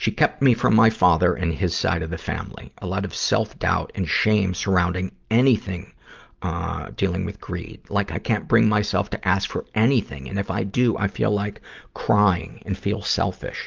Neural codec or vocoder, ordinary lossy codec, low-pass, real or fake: none; Opus, 32 kbps; 7.2 kHz; real